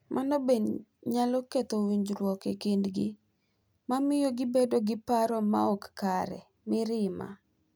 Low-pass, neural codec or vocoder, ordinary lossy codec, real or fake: none; none; none; real